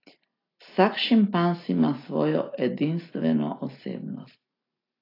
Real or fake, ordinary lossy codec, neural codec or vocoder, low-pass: real; AAC, 24 kbps; none; 5.4 kHz